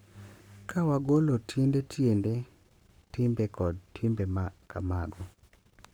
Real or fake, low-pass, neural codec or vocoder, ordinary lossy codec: fake; none; codec, 44.1 kHz, 7.8 kbps, Pupu-Codec; none